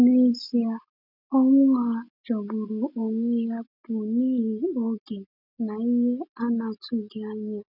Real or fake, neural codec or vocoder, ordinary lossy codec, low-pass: real; none; none; 5.4 kHz